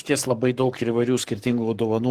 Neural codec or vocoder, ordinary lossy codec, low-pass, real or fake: codec, 44.1 kHz, 7.8 kbps, Pupu-Codec; Opus, 16 kbps; 14.4 kHz; fake